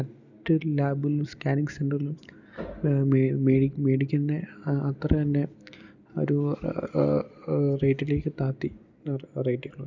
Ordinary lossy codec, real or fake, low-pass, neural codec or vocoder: none; real; 7.2 kHz; none